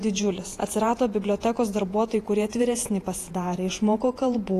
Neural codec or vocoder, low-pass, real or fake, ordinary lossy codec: vocoder, 48 kHz, 128 mel bands, Vocos; 14.4 kHz; fake; AAC, 48 kbps